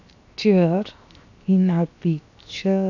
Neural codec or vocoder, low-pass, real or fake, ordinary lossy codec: codec, 16 kHz, 0.7 kbps, FocalCodec; 7.2 kHz; fake; none